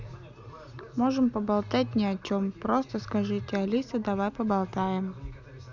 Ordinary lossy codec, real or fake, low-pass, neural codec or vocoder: none; real; 7.2 kHz; none